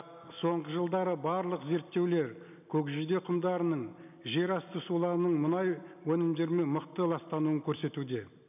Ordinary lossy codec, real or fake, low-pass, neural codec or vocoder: none; real; 3.6 kHz; none